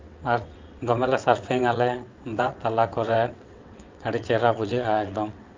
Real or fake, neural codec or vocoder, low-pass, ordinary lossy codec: fake; vocoder, 22.05 kHz, 80 mel bands, WaveNeXt; 7.2 kHz; Opus, 24 kbps